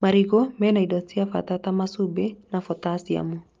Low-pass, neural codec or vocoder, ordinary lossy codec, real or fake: 7.2 kHz; none; Opus, 32 kbps; real